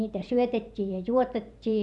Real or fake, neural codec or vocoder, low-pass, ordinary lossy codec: fake; vocoder, 44.1 kHz, 128 mel bands every 512 samples, BigVGAN v2; 10.8 kHz; none